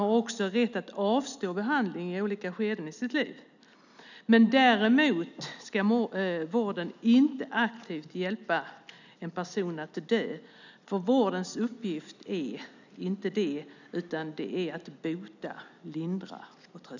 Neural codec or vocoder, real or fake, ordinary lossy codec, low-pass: none; real; none; 7.2 kHz